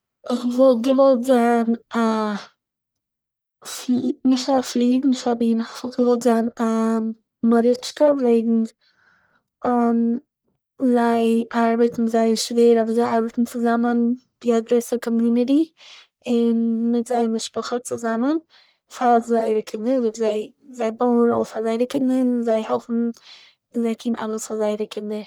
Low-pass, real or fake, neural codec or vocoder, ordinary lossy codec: none; fake; codec, 44.1 kHz, 1.7 kbps, Pupu-Codec; none